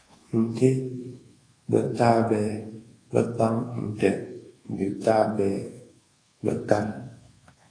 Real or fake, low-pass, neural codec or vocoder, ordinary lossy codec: fake; 9.9 kHz; codec, 24 kHz, 1.2 kbps, DualCodec; AAC, 32 kbps